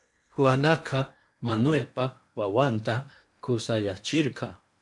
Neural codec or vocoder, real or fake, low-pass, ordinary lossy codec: codec, 16 kHz in and 24 kHz out, 0.8 kbps, FocalCodec, streaming, 65536 codes; fake; 10.8 kHz; MP3, 64 kbps